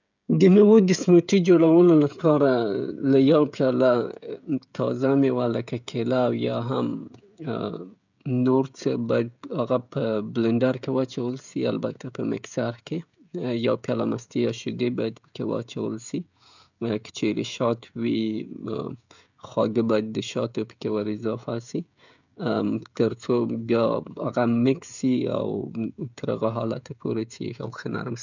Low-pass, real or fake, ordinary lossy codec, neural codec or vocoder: 7.2 kHz; fake; none; codec, 16 kHz, 16 kbps, FreqCodec, smaller model